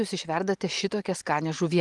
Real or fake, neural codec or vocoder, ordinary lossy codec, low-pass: real; none; Opus, 24 kbps; 10.8 kHz